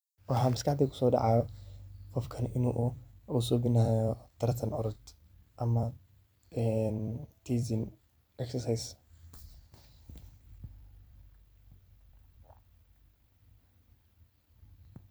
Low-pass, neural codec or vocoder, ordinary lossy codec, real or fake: none; vocoder, 44.1 kHz, 128 mel bands every 256 samples, BigVGAN v2; none; fake